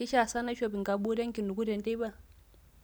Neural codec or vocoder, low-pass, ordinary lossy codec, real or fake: none; none; none; real